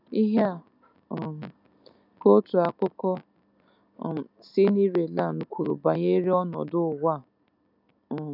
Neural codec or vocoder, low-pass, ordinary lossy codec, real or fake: none; 5.4 kHz; none; real